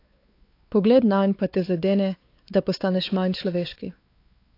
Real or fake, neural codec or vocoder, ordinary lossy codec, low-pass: fake; codec, 16 kHz, 4 kbps, X-Codec, WavLM features, trained on Multilingual LibriSpeech; AAC, 32 kbps; 5.4 kHz